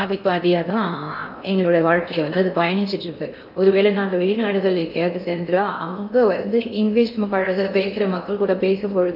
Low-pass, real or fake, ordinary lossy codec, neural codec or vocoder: 5.4 kHz; fake; AAC, 48 kbps; codec, 16 kHz in and 24 kHz out, 0.6 kbps, FocalCodec, streaming, 4096 codes